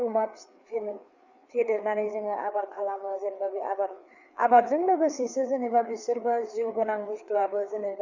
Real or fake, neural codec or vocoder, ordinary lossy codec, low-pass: fake; codec, 16 kHz, 4 kbps, FreqCodec, larger model; none; 7.2 kHz